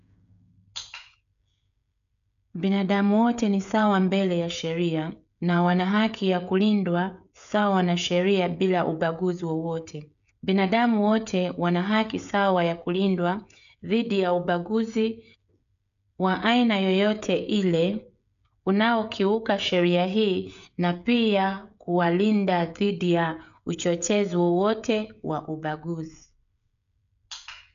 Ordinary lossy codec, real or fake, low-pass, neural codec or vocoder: none; fake; 7.2 kHz; codec, 16 kHz, 16 kbps, FreqCodec, smaller model